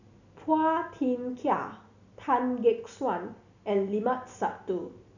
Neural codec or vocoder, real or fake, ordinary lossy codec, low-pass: none; real; none; 7.2 kHz